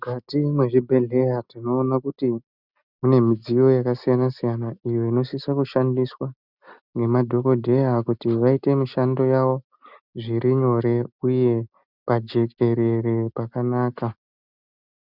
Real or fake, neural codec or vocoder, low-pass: real; none; 5.4 kHz